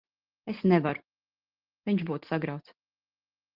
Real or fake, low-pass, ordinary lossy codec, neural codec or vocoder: real; 5.4 kHz; Opus, 24 kbps; none